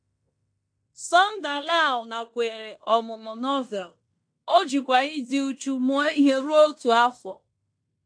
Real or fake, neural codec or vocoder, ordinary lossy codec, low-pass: fake; codec, 16 kHz in and 24 kHz out, 0.9 kbps, LongCat-Audio-Codec, fine tuned four codebook decoder; AAC, 64 kbps; 9.9 kHz